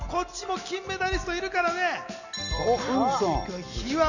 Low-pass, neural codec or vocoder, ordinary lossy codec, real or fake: 7.2 kHz; none; none; real